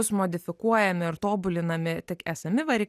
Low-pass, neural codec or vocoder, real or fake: 14.4 kHz; none; real